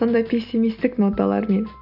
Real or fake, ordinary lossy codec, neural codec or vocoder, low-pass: real; none; none; 5.4 kHz